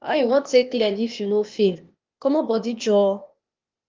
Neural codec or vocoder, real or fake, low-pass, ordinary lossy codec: codec, 16 kHz, 0.8 kbps, ZipCodec; fake; 7.2 kHz; Opus, 32 kbps